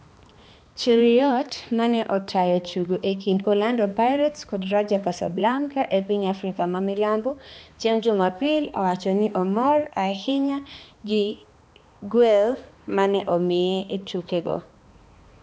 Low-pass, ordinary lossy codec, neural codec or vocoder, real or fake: none; none; codec, 16 kHz, 2 kbps, X-Codec, HuBERT features, trained on balanced general audio; fake